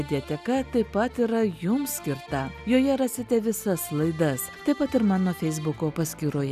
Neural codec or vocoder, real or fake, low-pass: none; real; 14.4 kHz